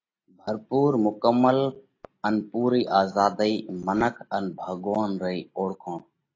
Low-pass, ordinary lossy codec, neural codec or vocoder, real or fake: 7.2 kHz; AAC, 32 kbps; none; real